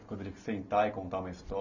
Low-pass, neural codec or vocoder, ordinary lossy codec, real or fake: 7.2 kHz; none; none; real